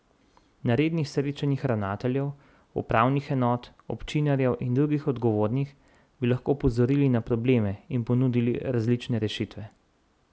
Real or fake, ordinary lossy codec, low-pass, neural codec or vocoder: real; none; none; none